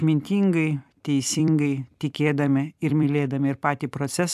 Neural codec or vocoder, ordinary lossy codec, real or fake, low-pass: vocoder, 44.1 kHz, 128 mel bands every 256 samples, BigVGAN v2; AAC, 96 kbps; fake; 14.4 kHz